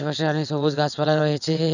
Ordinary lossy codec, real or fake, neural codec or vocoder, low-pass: none; fake; vocoder, 22.05 kHz, 80 mel bands, WaveNeXt; 7.2 kHz